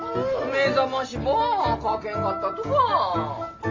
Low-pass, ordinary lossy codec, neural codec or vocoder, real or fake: 7.2 kHz; Opus, 32 kbps; none; real